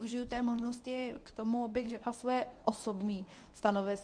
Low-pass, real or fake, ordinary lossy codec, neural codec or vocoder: 9.9 kHz; fake; MP3, 64 kbps; codec, 24 kHz, 0.9 kbps, WavTokenizer, medium speech release version 1